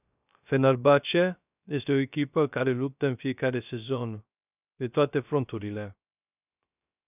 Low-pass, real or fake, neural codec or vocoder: 3.6 kHz; fake; codec, 16 kHz, 0.3 kbps, FocalCodec